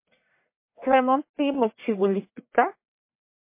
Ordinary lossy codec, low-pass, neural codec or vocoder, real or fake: MP3, 24 kbps; 3.6 kHz; codec, 44.1 kHz, 1.7 kbps, Pupu-Codec; fake